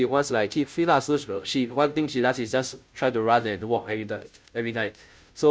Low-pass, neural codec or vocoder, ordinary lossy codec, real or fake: none; codec, 16 kHz, 0.5 kbps, FunCodec, trained on Chinese and English, 25 frames a second; none; fake